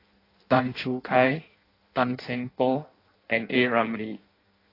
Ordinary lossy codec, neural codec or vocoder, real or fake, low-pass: AAC, 24 kbps; codec, 16 kHz in and 24 kHz out, 0.6 kbps, FireRedTTS-2 codec; fake; 5.4 kHz